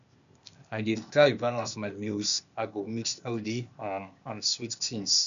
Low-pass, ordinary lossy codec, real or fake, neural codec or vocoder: 7.2 kHz; none; fake; codec, 16 kHz, 0.8 kbps, ZipCodec